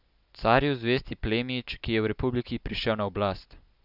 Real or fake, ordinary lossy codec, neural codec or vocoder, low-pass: real; none; none; 5.4 kHz